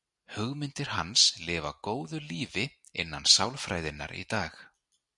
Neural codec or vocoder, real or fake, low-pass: none; real; 10.8 kHz